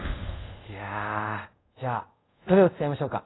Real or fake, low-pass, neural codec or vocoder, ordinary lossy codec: fake; 7.2 kHz; codec, 24 kHz, 0.5 kbps, DualCodec; AAC, 16 kbps